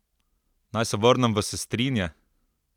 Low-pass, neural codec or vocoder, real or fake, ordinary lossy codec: 19.8 kHz; none; real; none